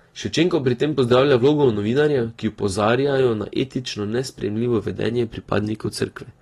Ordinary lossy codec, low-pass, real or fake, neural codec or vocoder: AAC, 32 kbps; 19.8 kHz; real; none